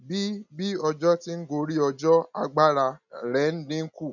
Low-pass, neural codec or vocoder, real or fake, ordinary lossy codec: 7.2 kHz; none; real; none